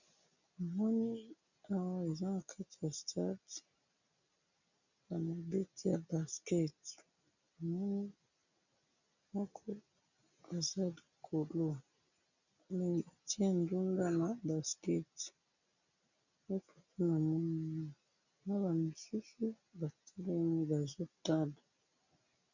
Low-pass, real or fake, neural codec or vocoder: 7.2 kHz; real; none